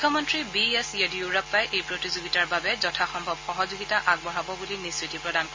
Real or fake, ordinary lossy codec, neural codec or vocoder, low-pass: real; MP3, 32 kbps; none; 7.2 kHz